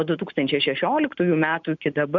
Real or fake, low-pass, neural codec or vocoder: real; 7.2 kHz; none